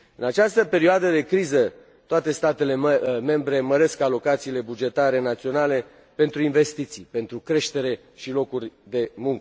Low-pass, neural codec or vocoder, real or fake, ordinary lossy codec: none; none; real; none